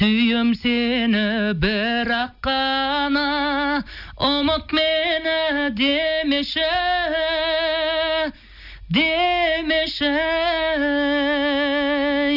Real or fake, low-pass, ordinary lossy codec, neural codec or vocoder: real; 5.4 kHz; none; none